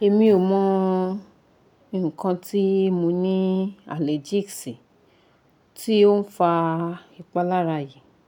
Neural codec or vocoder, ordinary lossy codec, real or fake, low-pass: none; none; real; 19.8 kHz